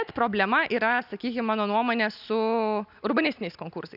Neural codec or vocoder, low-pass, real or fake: none; 5.4 kHz; real